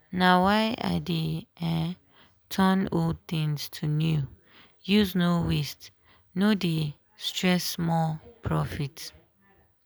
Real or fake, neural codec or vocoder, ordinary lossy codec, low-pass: real; none; none; none